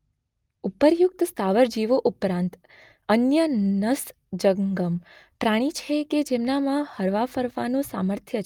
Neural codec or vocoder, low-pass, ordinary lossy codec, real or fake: none; 19.8 kHz; Opus, 32 kbps; real